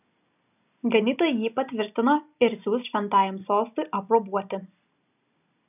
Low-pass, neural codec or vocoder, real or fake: 3.6 kHz; none; real